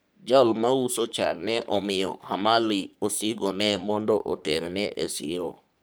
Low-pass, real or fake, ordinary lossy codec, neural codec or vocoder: none; fake; none; codec, 44.1 kHz, 3.4 kbps, Pupu-Codec